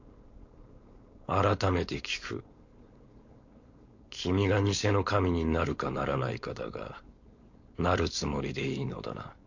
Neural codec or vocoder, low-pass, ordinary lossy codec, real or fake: codec, 16 kHz, 4.8 kbps, FACodec; 7.2 kHz; MP3, 48 kbps; fake